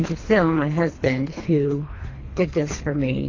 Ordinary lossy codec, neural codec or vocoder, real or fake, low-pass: AAC, 32 kbps; codec, 24 kHz, 3 kbps, HILCodec; fake; 7.2 kHz